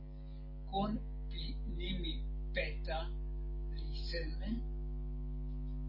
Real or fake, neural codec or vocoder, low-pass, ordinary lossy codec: real; none; 5.4 kHz; MP3, 24 kbps